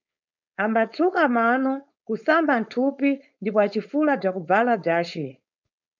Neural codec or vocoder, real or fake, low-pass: codec, 16 kHz, 4.8 kbps, FACodec; fake; 7.2 kHz